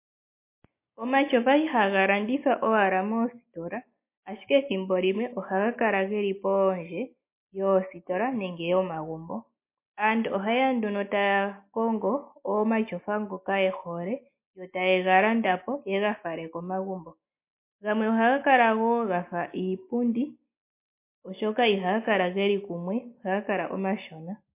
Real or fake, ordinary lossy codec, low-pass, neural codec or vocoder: real; MP3, 24 kbps; 3.6 kHz; none